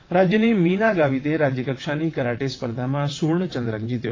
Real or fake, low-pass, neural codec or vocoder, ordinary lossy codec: fake; 7.2 kHz; vocoder, 22.05 kHz, 80 mel bands, WaveNeXt; AAC, 32 kbps